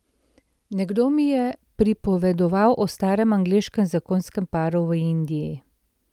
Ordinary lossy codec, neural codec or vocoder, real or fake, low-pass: Opus, 32 kbps; none; real; 19.8 kHz